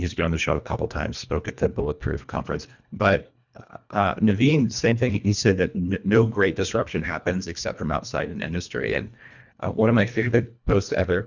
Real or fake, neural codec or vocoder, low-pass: fake; codec, 24 kHz, 1.5 kbps, HILCodec; 7.2 kHz